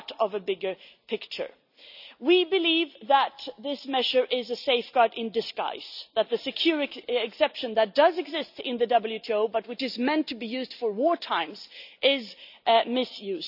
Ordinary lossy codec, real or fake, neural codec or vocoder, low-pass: none; real; none; 5.4 kHz